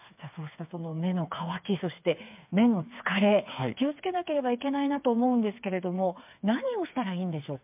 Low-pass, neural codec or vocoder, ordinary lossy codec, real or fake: 3.6 kHz; codec, 16 kHz, 4 kbps, FreqCodec, smaller model; MP3, 32 kbps; fake